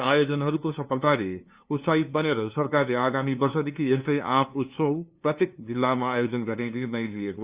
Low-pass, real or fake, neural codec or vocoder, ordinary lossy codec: 3.6 kHz; fake; codec, 16 kHz, 2 kbps, FunCodec, trained on LibriTTS, 25 frames a second; Opus, 64 kbps